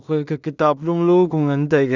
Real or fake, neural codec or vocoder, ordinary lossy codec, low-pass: fake; codec, 16 kHz in and 24 kHz out, 0.4 kbps, LongCat-Audio-Codec, two codebook decoder; none; 7.2 kHz